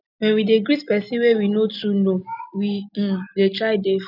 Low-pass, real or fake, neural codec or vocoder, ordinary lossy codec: 5.4 kHz; real; none; none